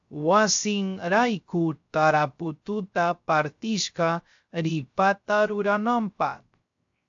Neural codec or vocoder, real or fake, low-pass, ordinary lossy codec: codec, 16 kHz, 0.3 kbps, FocalCodec; fake; 7.2 kHz; AAC, 64 kbps